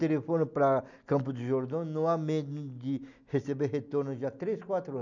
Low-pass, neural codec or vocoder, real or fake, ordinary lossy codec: 7.2 kHz; none; real; none